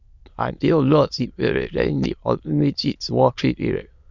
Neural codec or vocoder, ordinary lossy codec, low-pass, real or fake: autoencoder, 22.05 kHz, a latent of 192 numbers a frame, VITS, trained on many speakers; none; 7.2 kHz; fake